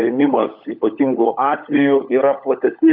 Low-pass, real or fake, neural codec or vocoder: 5.4 kHz; fake; codec, 16 kHz, 16 kbps, FunCodec, trained on LibriTTS, 50 frames a second